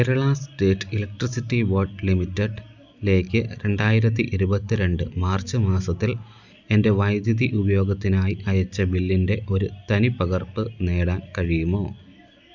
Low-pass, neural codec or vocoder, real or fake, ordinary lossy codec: 7.2 kHz; none; real; none